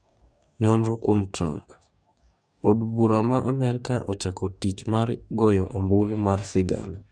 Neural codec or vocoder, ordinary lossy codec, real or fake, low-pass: codec, 44.1 kHz, 2.6 kbps, DAC; none; fake; 9.9 kHz